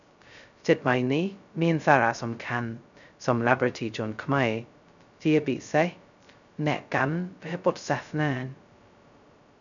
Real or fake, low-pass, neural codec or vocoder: fake; 7.2 kHz; codec, 16 kHz, 0.2 kbps, FocalCodec